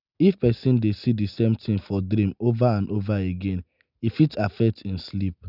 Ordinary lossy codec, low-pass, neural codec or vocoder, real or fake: none; 5.4 kHz; none; real